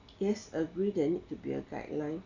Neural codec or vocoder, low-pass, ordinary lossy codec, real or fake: none; 7.2 kHz; none; real